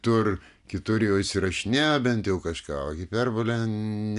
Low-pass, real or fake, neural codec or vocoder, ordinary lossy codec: 10.8 kHz; real; none; AAC, 96 kbps